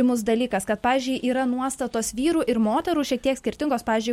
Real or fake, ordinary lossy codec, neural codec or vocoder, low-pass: real; MP3, 64 kbps; none; 19.8 kHz